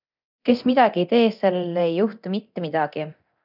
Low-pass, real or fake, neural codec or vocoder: 5.4 kHz; fake; codec, 24 kHz, 0.9 kbps, DualCodec